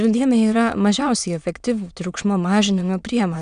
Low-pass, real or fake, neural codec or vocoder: 9.9 kHz; fake; autoencoder, 22.05 kHz, a latent of 192 numbers a frame, VITS, trained on many speakers